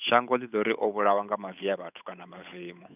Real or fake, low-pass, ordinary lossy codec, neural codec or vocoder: fake; 3.6 kHz; none; codec, 16 kHz, 8 kbps, FunCodec, trained on Chinese and English, 25 frames a second